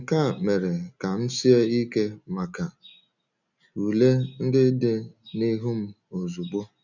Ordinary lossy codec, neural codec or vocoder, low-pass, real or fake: none; none; 7.2 kHz; real